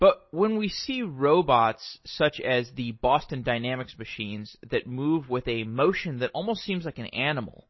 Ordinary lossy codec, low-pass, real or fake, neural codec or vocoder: MP3, 24 kbps; 7.2 kHz; real; none